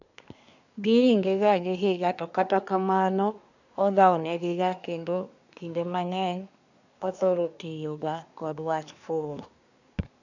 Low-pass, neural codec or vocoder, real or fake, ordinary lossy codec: 7.2 kHz; codec, 24 kHz, 1 kbps, SNAC; fake; none